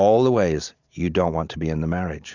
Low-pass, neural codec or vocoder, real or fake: 7.2 kHz; none; real